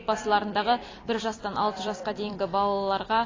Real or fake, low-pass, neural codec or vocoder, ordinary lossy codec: fake; 7.2 kHz; vocoder, 44.1 kHz, 128 mel bands every 256 samples, BigVGAN v2; AAC, 32 kbps